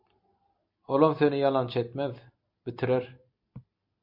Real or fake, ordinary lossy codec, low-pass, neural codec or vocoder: real; MP3, 32 kbps; 5.4 kHz; none